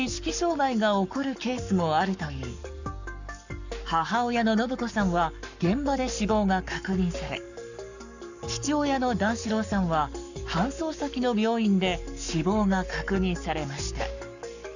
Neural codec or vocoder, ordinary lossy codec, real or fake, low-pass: codec, 44.1 kHz, 7.8 kbps, Pupu-Codec; none; fake; 7.2 kHz